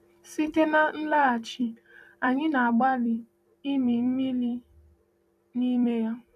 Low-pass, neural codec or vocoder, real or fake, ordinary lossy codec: 14.4 kHz; vocoder, 44.1 kHz, 128 mel bands every 256 samples, BigVGAN v2; fake; none